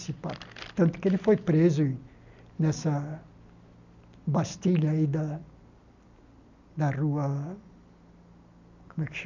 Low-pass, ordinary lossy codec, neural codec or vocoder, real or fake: 7.2 kHz; none; none; real